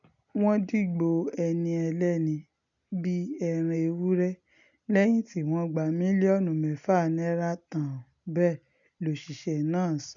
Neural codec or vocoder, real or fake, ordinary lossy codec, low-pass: none; real; none; 7.2 kHz